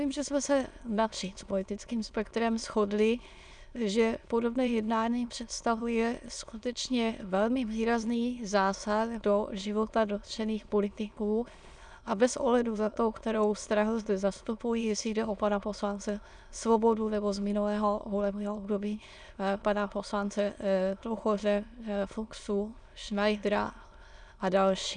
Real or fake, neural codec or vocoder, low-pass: fake; autoencoder, 22.05 kHz, a latent of 192 numbers a frame, VITS, trained on many speakers; 9.9 kHz